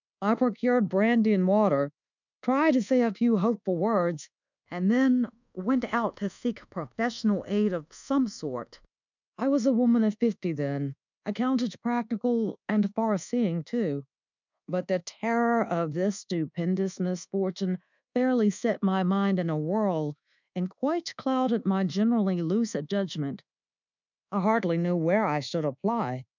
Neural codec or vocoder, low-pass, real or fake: codec, 24 kHz, 1.2 kbps, DualCodec; 7.2 kHz; fake